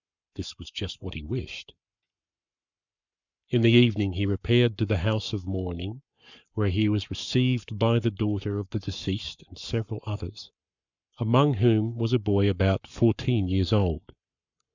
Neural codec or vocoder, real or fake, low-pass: codec, 44.1 kHz, 7.8 kbps, Pupu-Codec; fake; 7.2 kHz